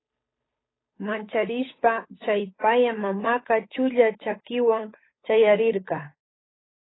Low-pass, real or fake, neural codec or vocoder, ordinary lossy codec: 7.2 kHz; fake; codec, 16 kHz, 8 kbps, FunCodec, trained on Chinese and English, 25 frames a second; AAC, 16 kbps